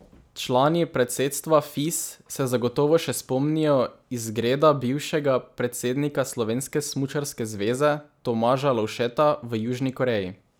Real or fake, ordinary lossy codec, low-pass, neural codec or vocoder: real; none; none; none